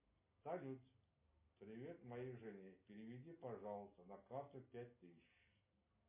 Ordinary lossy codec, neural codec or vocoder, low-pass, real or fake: MP3, 24 kbps; none; 3.6 kHz; real